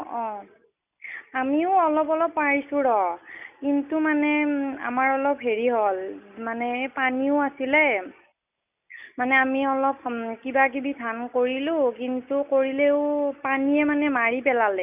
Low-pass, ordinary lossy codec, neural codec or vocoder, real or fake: 3.6 kHz; none; none; real